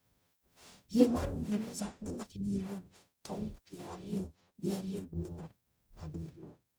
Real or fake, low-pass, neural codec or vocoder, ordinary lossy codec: fake; none; codec, 44.1 kHz, 0.9 kbps, DAC; none